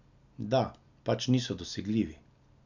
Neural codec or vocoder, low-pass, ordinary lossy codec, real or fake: none; 7.2 kHz; none; real